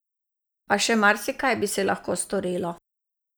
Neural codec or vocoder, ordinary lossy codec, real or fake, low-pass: none; none; real; none